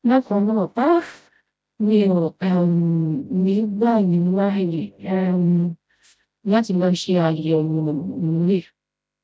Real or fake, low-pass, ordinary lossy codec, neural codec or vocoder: fake; none; none; codec, 16 kHz, 0.5 kbps, FreqCodec, smaller model